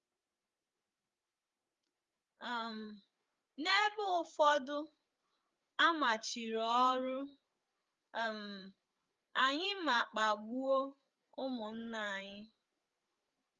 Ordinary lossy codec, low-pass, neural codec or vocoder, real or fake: Opus, 32 kbps; 7.2 kHz; codec, 16 kHz, 4 kbps, FreqCodec, larger model; fake